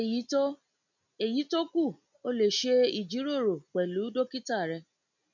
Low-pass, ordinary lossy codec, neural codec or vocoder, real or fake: 7.2 kHz; none; none; real